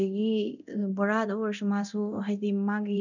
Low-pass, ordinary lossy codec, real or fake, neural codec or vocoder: 7.2 kHz; none; fake; codec, 24 kHz, 0.9 kbps, DualCodec